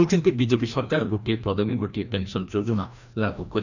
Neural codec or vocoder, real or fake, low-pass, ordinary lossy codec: codec, 16 kHz, 1 kbps, FreqCodec, larger model; fake; 7.2 kHz; none